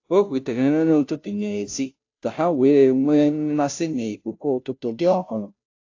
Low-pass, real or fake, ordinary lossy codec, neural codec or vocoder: 7.2 kHz; fake; AAC, 48 kbps; codec, 16 kHz, 0.5 kbps, FunCodec, trained on Chinese and English, 25 frames a second